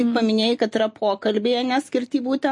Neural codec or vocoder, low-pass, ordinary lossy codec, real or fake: none; 10.8 kHz; MP3, 48 kbps; real